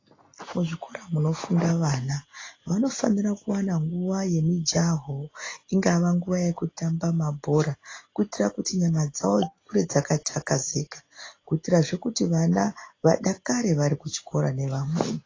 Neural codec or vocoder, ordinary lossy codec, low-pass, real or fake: none; AAC, 32 kbps; 7.2 kHz; real